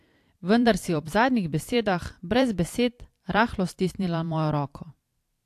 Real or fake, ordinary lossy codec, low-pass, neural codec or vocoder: fake; AAC, 64 kbps; 14.4 kHz; vocoder, 44.1 kHz, 128 mel bands every 512 samples, BigVGAN v2